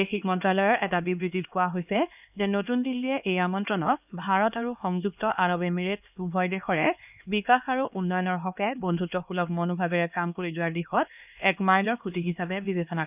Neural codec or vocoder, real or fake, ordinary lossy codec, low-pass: codec, 16 kHz, 2 kbps, X-Codec, HuBERT features, trained on LibriSpeech; fake; none; 3.6 kHz